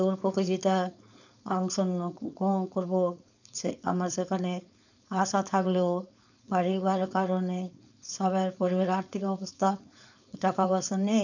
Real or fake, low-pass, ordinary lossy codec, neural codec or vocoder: fake; 7.2 kHz; none; codec, 16 kHz, 4.8 kbps, FACodec